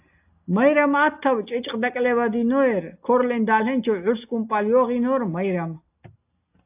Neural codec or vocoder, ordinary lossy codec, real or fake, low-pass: none; AAC, 32 kbps; real; 3.6 kHz